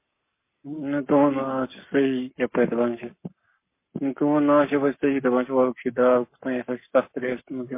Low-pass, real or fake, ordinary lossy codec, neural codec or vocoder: 3.6 kHz; fake; MP3, 24 kbps; codec, 44.1 kHz, 7.8 kbps, Pupu-Codec